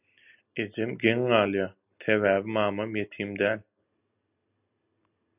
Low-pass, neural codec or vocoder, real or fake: 3.6 kHz; none; real